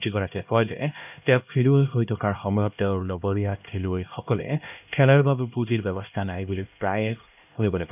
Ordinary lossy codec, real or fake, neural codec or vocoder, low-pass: none; fake; codec, 16 kHz, 1 kbps, X-Codec, HuBERT features, trained on LibriSpeech; 3.6 kHz